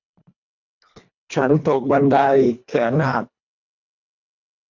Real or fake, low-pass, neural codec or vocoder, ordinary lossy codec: fake; 7.2 kHz; codec, 24 kHz, 1.5 kbps, HILCodec; none